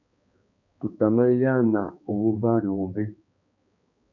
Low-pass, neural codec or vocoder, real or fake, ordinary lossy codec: 7.2 kHz; codec, 16 kHz, 2 kbps, X-Codec, HuBERT features, trained on balanced general audio; fake; MP3, 64 kbps